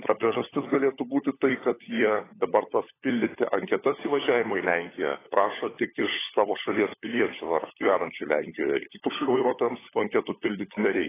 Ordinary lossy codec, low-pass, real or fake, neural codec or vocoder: AAC, 16 kbps; 3.6 kHz; fake; codec, 16 kHz, 16 kbps, FunCodec, trained on LibriTTS, 50 frames a second